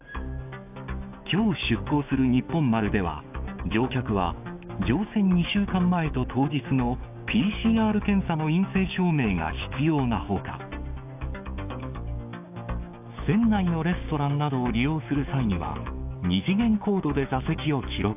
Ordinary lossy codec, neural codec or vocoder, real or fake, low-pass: AAC, 32 kbps; codec, 16 kHz, 6 kbps, DAC; fake; 3.6 kHz